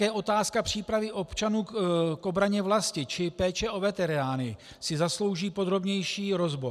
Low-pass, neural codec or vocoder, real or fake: 14.4 kHz; none; real